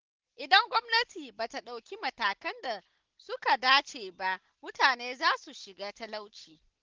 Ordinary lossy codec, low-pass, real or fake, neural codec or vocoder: Opus, 16 kbps; 7.2 kHz; real; none